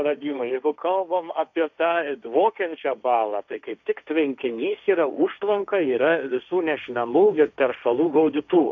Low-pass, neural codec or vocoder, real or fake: 7.2 kHz; codec, 16 kHz, 1.1 kbps, Voila-Tokenizer; fake